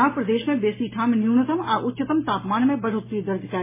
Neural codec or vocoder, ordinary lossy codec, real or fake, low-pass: none; MP3, 16 kbps; real; 3.6 kHz